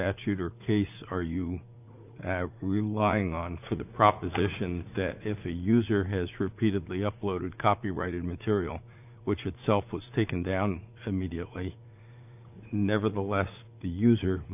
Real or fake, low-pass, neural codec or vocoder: fake; 3.6 kHz; vocoder, 44.1 kHz, 80 mel bands, Vocos